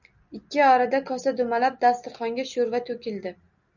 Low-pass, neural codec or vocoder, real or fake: 7.2 kHz; none; real